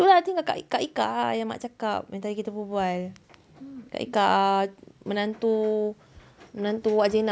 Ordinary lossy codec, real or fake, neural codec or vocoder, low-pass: none; real; none; none